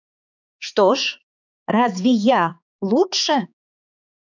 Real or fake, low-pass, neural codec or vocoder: fake; 7.2 kHz; codec, 16 kHz, 4 kbps, X-Codec, HuBERT features, trained on balanced general audio